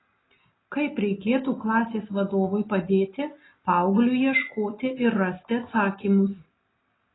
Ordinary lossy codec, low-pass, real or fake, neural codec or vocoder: AAC, 16 kbps; 7.2 kHz; real; none